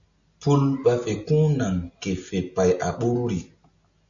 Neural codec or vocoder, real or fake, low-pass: none; real; 7.2 kHz